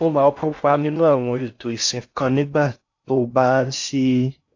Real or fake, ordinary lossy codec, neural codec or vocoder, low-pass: fake; AAC, 48 kbps; codec, 16 kHz in and 24 kHz out, 0.6 kbps, FocalCodec, streaming, 2048 codes; 7.2 kHz